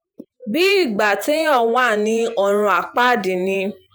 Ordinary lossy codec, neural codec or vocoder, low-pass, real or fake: none; vocoder, 48 kHz, 128 mel bands, Vocos; none; fake